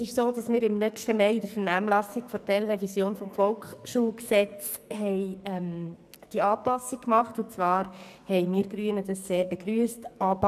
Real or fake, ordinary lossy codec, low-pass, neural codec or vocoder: fake; none; 14.4 kHz; codec, 44.1 kHz, 2.6 kbps, SNAC